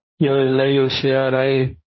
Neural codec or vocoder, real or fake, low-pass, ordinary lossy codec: codec, 16 kHz, 1.1 kbps, Voila-Tokenizer; fake; 7.2 kHz; MP3, 24 kbps